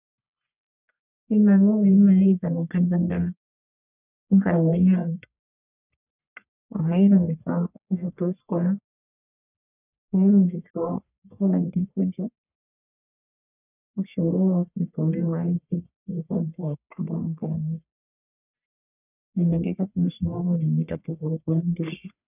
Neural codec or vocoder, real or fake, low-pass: codec, 44.1 kHz, 1.7 kbps, Pupu-Codec; fake; 3.6 kHz